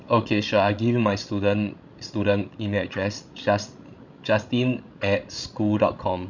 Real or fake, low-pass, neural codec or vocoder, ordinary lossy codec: fake; 7.2 kHz; codec, 16 kHz, 16 kbps, FreqCodec, larger model; none